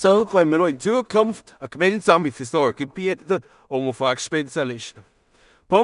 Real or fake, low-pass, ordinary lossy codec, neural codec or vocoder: fake; 10.8 kHz; none; codec, 16 kHz in and 24 kHz out, 0.4 kbps, LongCat-Audio-Codec, two codebook decoder